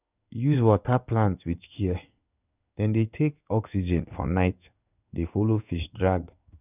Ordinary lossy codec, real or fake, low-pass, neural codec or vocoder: none; fake; 3.6 kHz; codec, 16 kHz in and 24 kHz out, 1 kbps, XY-Tokenizer